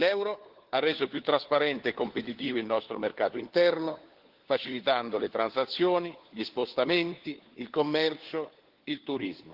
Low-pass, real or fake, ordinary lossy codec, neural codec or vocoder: 5.4 kHz; fake; Opus, 16 kbps; codec, 16 kHz, 4 kbps, FunCodec, trained on LibriTTS, 50 frames a second